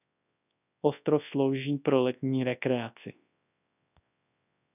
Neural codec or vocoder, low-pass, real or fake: codec, 24 kHz, 0.9 kbps, WavTokenizer, large speech release; 3.6 kHz; fake